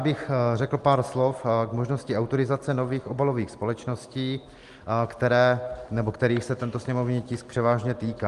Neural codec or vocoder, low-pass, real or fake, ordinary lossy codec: none; 10.8 kHz; real; Opus, 24 kbps